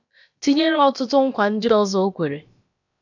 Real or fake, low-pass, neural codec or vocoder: fake; 7.2 kHz; codec, 16 kHz, about 1 kbps, DyCAST, with the encoder's durations